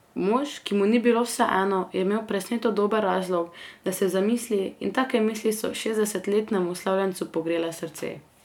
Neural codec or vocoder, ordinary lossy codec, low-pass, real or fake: none; none; 19.8 kHz; real